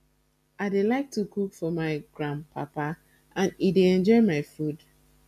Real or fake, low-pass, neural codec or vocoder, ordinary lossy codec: real; 14.4 kHz; none; none